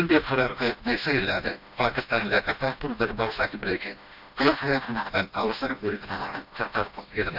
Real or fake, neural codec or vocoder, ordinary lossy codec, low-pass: fake; codec, 16 kHz, 1 kbps, FreqCodec, smaller model; none; 5.4 kHz